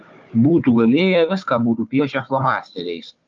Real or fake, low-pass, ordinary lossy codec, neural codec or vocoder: fake; 7.2 kHz; Opus, 24 kbps; codec, 16 kHz, 4 kbps, FunCodec, trained on Chinese and English, 50 frames a second